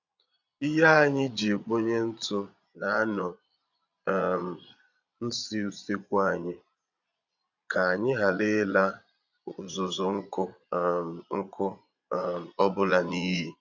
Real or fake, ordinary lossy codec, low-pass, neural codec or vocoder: fake; none; 7.2 kHz; vocoder, 22.05 kHz, 80 mel bands, Vocos